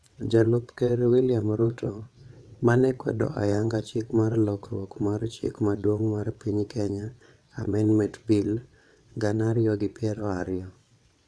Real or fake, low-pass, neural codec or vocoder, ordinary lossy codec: fake; none; vocoder, 22.05 kHz, 80 mel bands, WaveNeXt; none